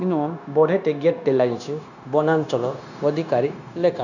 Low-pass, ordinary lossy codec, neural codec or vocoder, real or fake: 7.2 kHz; none; codec, 16 kHz, 0.9 kbps, LongCat-Audio-Codec; fake